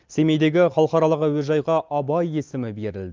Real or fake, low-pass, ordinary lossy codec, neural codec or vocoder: real; 7.2 kHz; Opus, 24 kbps; none